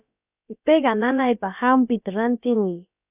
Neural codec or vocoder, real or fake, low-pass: codec, 16 kHz, about 1 kbps, DyCAST, with the encoder's durations; fake; 3.6 kHz